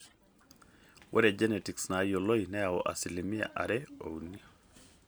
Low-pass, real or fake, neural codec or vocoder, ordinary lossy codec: none; real; none; none